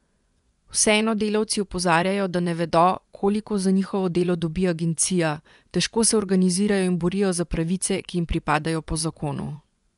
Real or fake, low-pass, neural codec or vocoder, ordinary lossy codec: fake; 10.8 kHz; vocoder, 24 kHz, 100 mel bands, Vocos; none